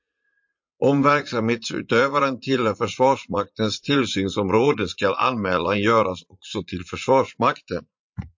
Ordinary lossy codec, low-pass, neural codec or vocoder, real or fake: MP3, 48 kbps; 7.2 kHz; vocoder, 44.1 kHz, 80 mel bands, Vocos; fake